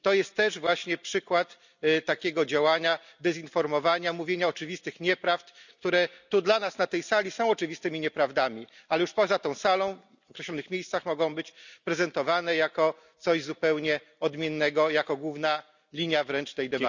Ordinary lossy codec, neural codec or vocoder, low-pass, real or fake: none; none; 7.2 kHz; real